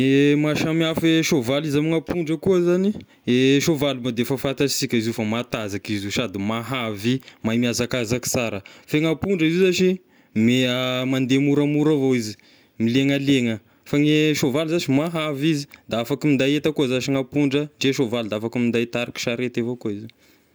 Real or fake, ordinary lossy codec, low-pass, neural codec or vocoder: real; none; none; none